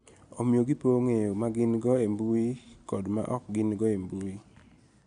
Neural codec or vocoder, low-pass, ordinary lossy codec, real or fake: none; 9.9 kHz; none; real